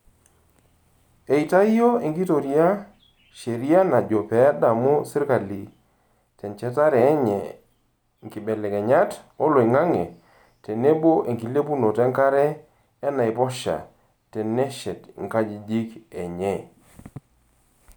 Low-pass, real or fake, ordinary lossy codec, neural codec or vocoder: none; real; none; none